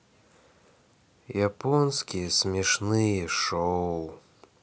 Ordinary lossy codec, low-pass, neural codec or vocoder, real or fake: none; none; none; real